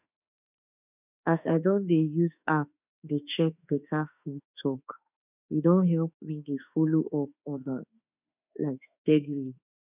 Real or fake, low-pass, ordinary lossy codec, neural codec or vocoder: fake; 3.6 kHz; none; autoencoder, 48 kHz, 32 numbers a frame, DAC-VAE, trained on Japanese speech